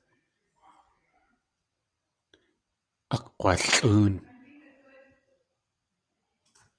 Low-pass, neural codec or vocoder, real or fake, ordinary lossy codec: 9.9 kHz; vocoder, 22.05 kHz, 80 mel bands, WaveNeXt; fake; Opus, 64 kbps